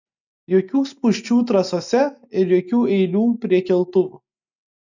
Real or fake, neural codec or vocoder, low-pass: real; none; 7.2 kHz